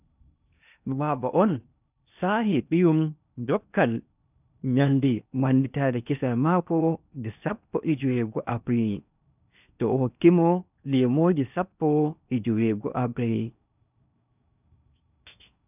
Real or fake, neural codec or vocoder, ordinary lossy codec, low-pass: fake; codec, 16 kHz in and 24 kHz out, 0.8 kbps, FocalCodec, streaming, 65536 codes; none; 3.6 kHz